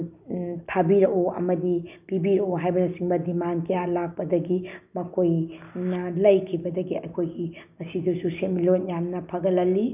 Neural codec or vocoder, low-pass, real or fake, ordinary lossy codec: none; 3.6 kHz; real; none